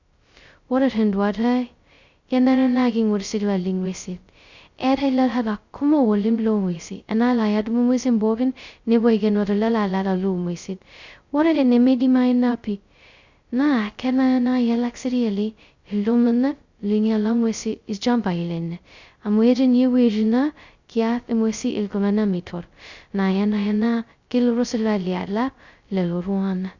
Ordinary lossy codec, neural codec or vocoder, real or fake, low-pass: Opus, 64 kbps; codec, 16 kHz, 0.2 kbps, FocalCodec; fake; 7.2 kHz